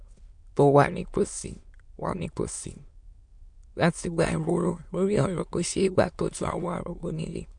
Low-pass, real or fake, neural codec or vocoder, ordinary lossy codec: 9.9 kHz; fake; autoencoder, 22.05 kHz, a latent of 192 numbers a frame, VITS, trained on many speakers; MP3, 64 kbps